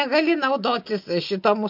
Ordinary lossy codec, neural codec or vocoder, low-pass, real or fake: MP3, 48 kbps; none; 5.4 kHz; real